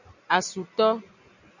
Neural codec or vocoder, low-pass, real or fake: none; 7.2 kHz; real